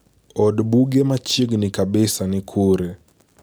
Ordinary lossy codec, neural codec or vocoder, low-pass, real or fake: none; none; none; real